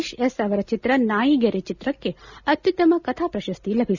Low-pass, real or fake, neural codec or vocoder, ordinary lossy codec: 7.2 kHz; real; none; none